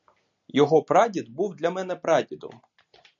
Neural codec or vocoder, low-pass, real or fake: none; 7.2 kHz; real